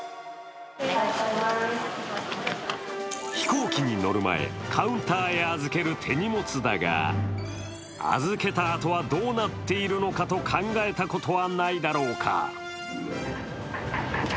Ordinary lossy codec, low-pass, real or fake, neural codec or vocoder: none; none; real; none